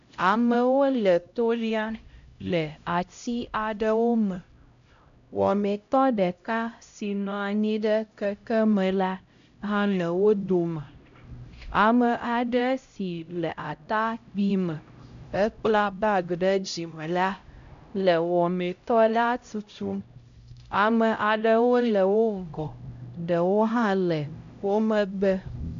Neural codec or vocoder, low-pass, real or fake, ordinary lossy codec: codec, 16 kHz, 0.5 kbps, X-Codec, HuBERT features, trained on LibriSpeech; 7.2 kHz; fake; AAC, 96 kbps